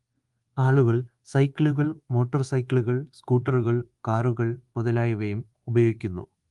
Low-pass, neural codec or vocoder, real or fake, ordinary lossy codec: 10.8 kHz; codec, 24 kHz, 1.2 kbps, DualCodec; fake; Opus, 24 kbps